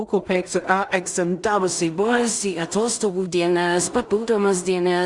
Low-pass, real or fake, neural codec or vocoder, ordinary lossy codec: 10.8 kHz; fake; codec, 16 kHz in and 24 kHz out, 0.4 kbps, LongCat-Audio-Codec, two codebook decoder; Opus, 64 kbps